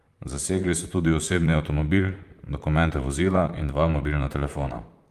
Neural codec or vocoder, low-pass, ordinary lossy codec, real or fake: vocoder, 44.1 kHz, 128 mel bands, Pupu-Vocoder; 14.4 kHz; Opus, 32 kbps; fake